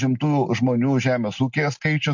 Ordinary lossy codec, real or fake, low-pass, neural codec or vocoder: MP3, 48 kbps; real; 7.2 kHz; none